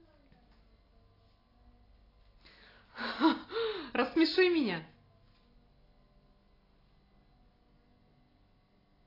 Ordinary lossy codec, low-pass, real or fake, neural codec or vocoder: AAC, 24 kbps; 5.4 kHz; real; none